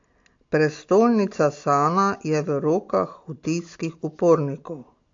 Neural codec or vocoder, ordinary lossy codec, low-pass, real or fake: none; AAC, 48 kbps; 7.2 kHz; real